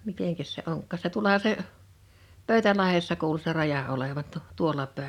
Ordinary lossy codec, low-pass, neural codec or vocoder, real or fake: none; 19.8 kHz; none; real